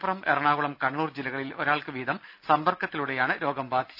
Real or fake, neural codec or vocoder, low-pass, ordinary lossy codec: real; none; 5.4 kHz; none